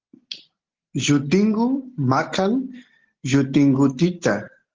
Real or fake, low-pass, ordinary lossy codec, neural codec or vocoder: real; 7.2 kHz; Opus, 16 kbps; none